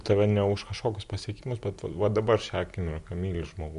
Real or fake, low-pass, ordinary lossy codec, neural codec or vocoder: real; 10.8 kHz; MP3, 64 kbps; none